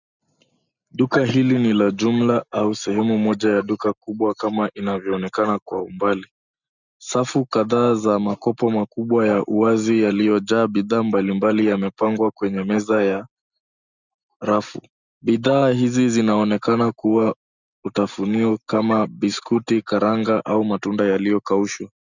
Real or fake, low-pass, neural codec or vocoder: real; 7.2 kHz; none